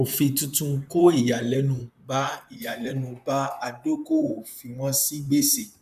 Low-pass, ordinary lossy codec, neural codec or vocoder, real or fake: 14.4 kHz; none; vocoder, 44.1 kHz, 128 mel bands, Pupu-Vocoder; fake